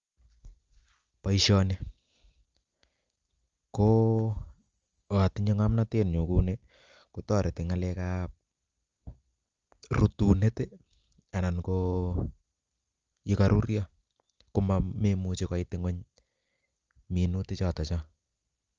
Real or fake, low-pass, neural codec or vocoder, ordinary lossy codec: real; 7.2 kHz; none; Opus, 32 kbps